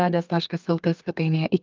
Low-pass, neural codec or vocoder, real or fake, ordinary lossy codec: 7.2 kHz; codec, 32 kHz, 1.9 kbps, SNAC; fake; Opus, 24 kbps